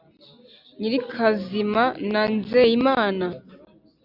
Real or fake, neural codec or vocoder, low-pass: real; none; 5.4 kHz